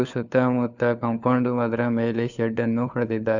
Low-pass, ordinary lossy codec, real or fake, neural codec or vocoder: 7.2 kHz; none; fake; codec, 16 kHz, 4 kbps, FunCodec, trained on LibriTTS, 50 frames a second